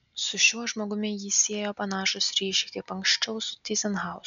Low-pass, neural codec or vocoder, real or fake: 7.2 kHz; none; real